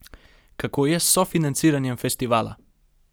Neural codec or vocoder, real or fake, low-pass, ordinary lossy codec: none; real; none; none